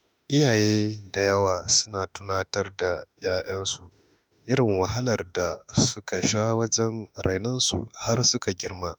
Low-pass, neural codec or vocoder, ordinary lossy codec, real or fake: none; autoencoder, 48 kHz, 32 numbers a frame, DAC-VAE, trained on Japanese speech; none; fake